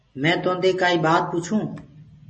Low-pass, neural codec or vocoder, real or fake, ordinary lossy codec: 10.8 kHz; none; real; MP3, 32 kbps